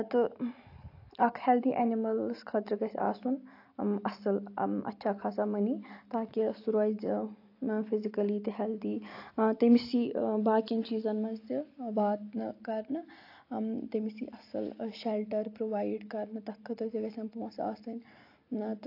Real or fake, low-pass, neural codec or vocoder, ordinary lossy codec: real; 5.4 kHz; none; AAC, 32 kbps